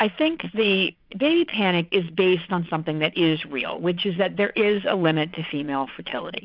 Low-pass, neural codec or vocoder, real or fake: 5.4 kHz; vocoder, 22.05 kHz, 80 mel bands, Vocos; fake